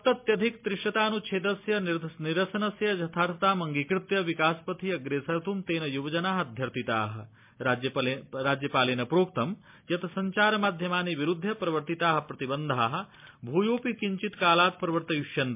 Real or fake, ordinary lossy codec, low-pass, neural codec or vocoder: real; MP3, 32 kbps; 3.6 kHz; none